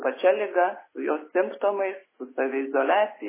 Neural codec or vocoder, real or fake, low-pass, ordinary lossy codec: none; real; 3.6 kHz; MP3, 16 kbps